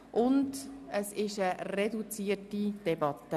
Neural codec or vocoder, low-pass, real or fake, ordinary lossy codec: none; 14.4 kHz; real; none